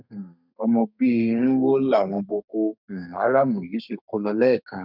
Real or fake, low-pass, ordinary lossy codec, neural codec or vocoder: fake; 5.4 kHz; none; codec, 32 kHz, 1.9 kbps, SNAC